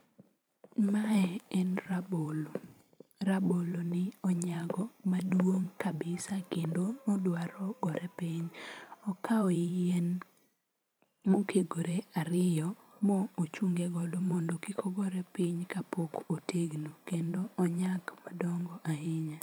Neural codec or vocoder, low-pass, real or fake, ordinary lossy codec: vocoder, 44.1 kHz, 128 mel bands every 512 samples, BigVGAN v2; none; fake; none